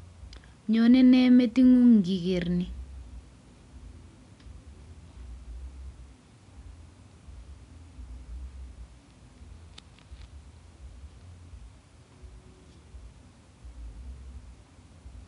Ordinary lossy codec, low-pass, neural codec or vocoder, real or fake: MP3, 96 kbps; 10.8 kHz; none; real